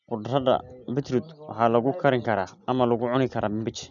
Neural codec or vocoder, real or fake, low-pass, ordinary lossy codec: none; real; 7.2 kHz; none